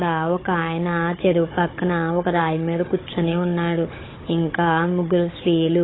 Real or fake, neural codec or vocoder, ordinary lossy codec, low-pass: fake; codec, 16 kHz, 16 kbps, FunCodec, trained on Chinese and English, 50 frames a second; AAC, 16 kbps; 7.2 kHz